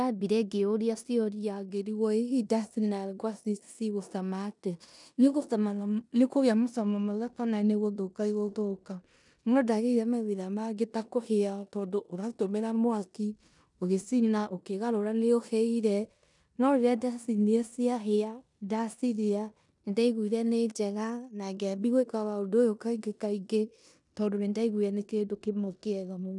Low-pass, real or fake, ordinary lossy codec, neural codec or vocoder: 10.8 kHz; fake; none; codec, 16 kHz in and 24 kHz out, 0.9 kbps, LongCat-Audio-Codec, four codebook decoder